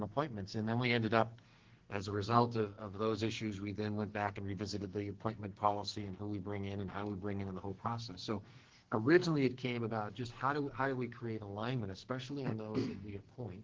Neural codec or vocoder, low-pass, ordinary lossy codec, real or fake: codec, 44.1 kHz, 2.6 kbps, SNAC; 7.2 kHz; Opus, 16 kbps; fake